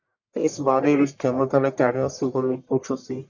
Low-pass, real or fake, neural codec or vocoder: 7.2 kHz; fake; codec, 44.1 kHz, 1.7 kbps, Pupu-Codec